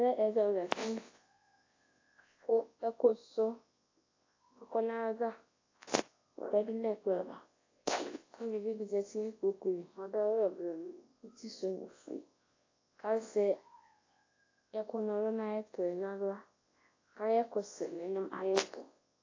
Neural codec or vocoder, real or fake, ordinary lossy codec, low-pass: codec, 24 kHz, 0.9 kbps, WavTokenizer, large speech release; fake; AAC, 32 kbps; 7.2 kHz